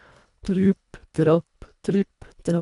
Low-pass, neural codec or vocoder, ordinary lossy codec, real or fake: 10.8 kHz; codec, 24 kHz, 1.5 kbps, HILCodec; none; fake